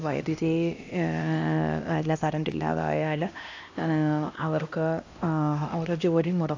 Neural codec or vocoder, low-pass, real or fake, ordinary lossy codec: codec, 16 kHz, 1 kbps, X-Codec, HuBERT features, trained on LibriSpeech; 7.2 kHz; fake; AAC, 48 kbps